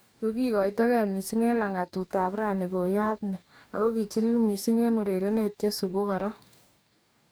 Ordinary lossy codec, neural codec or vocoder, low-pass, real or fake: none; codec, 44.1 kHz, 2.6 kbps, DAC; none; fake